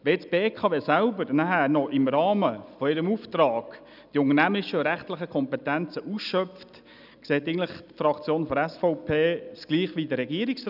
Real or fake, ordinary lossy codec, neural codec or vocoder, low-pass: real; none; none; 5.4 kHz